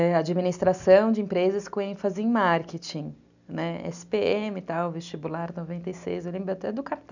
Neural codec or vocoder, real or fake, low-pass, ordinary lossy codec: none; real; 7.2 kHz; none